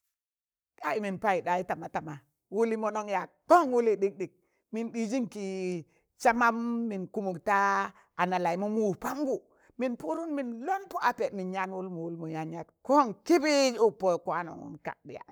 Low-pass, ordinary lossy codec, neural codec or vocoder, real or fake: none; none; codec, 44.1 kHz, 7.8 kbps, Pupu-Codec; fake